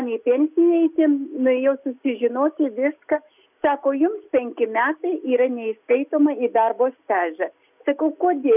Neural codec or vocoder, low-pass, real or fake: none; 3.6 kHz; real